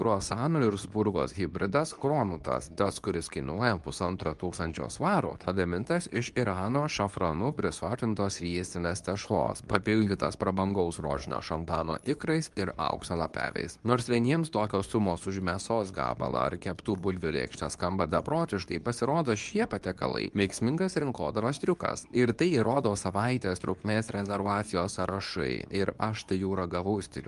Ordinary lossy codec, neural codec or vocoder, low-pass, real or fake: Opus, 32 kbps; codec, 24 kHz, 0.9 kbps, WavTokenizer, medium speech release version 2; 10.8 kHz; fake